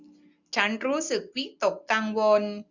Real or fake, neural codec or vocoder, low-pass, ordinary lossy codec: real; none; 7.2 kHz; none